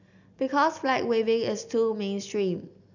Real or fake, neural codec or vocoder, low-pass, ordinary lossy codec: real; none; 7.2 kHz; none